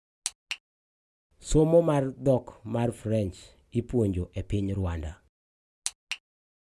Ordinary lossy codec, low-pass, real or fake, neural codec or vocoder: none; none; real; none